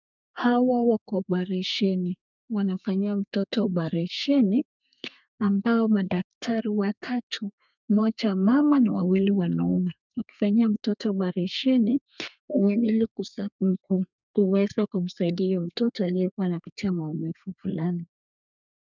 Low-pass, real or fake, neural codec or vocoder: 7.2 kHz; fake; codec, 32 kHz, 1.9 kbps, SNAC